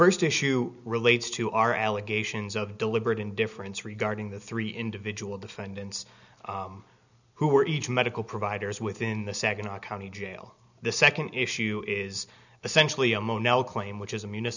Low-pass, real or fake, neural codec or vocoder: 7.2 kHz; real; none